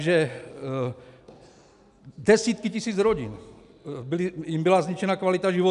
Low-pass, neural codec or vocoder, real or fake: 10.8 kHz; none; real